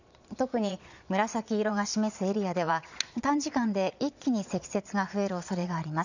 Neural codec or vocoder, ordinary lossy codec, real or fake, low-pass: vocoder, 22.05 kHz, 80 mel bands, Vocos; none; fake; 7.2 kHz